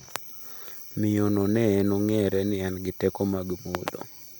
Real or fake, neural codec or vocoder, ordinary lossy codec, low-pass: real; none; none; none